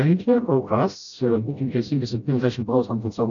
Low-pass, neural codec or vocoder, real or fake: 7.2 kHz; codec, 16 kHz, 0.5 kbps, FreqCodec, smaller model; fake